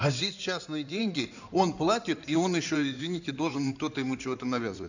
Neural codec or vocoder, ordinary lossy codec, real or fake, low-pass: codec, 16 kHz in and 24 kHz out, 2.2 kbps, FireRedTTS-2 codec; none; fake; 7.2 kHz